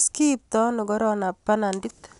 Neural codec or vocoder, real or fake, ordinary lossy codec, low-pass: none; real; none; 10.8 kHz